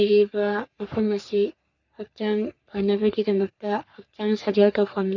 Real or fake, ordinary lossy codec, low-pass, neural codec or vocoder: fake; none; 7.2 kHz; codec, 44.1 kHz, 3.4 kbps, Pupu-Codec